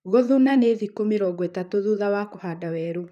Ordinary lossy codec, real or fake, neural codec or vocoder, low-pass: none; fake; vocoder, 44.1 kHz, 128 mel bands, Pupu-Vocoder; 14.4 kHz